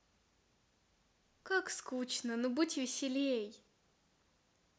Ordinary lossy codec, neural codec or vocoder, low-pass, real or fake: none; none; none; real